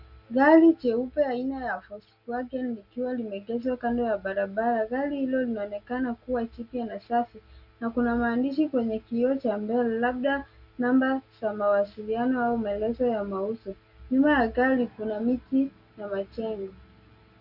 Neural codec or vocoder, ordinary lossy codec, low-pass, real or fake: none; Opus, 64 kbps; 5.4 kHz; real